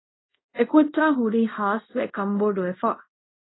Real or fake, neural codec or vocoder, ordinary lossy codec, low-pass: fake; codec, 24 kHz, 0.5 kbps, DualCodec; AAC, 16 kbps; 7.2 kHz